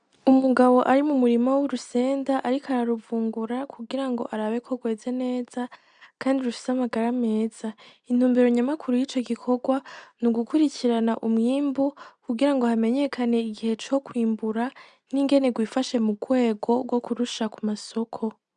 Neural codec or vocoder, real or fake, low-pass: none; real; 9.9 kHz